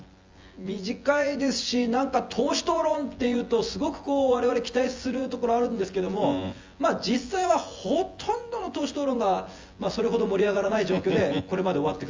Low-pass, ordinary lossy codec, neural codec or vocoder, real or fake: 7.2 kHz; Opus, 32 kbps; vocoder, 24 kHz, 100 mel bands, Vocos; fake